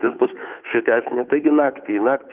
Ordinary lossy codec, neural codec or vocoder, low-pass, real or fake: Opus, 32 kbps; codec, 16 kHz, 2 kbps, FunCodec, trained on Chinese and English, 25 frames a second; 3.6 kHz; fake